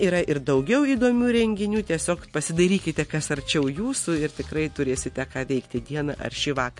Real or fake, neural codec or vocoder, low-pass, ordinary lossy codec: real; none; 10.8 kHz; MP3, 48 kbps